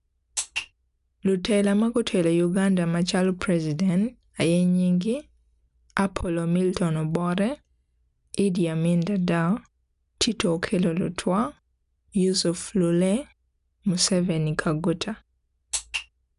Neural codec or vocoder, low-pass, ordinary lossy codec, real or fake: none; 10.8 kHz; none; real